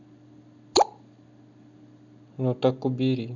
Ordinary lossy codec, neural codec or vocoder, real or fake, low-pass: none; none; real; 7.2 kHz